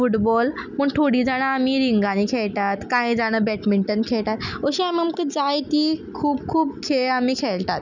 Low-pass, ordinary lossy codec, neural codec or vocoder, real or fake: 7.2 kHz; none; none; real